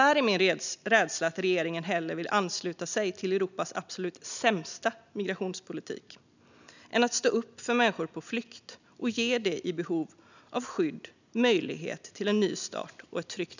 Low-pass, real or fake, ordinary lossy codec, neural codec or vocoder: 7.2 kHz; real; none; none